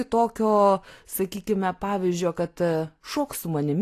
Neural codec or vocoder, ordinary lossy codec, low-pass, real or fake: none; AAC, 48 kbps; 14.4 kHz; real